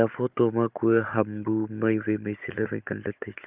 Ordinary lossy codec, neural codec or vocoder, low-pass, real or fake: Opus, 32 kbps; codec, 16 kHz, 16 kbps, FunCodec, trained on Chinese and English, 50 frames a second; 3.6 kHz; fake